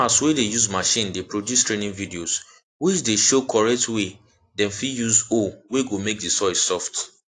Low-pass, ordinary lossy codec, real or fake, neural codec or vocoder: 10.8 kHz; AAC, 48 kbps; real; none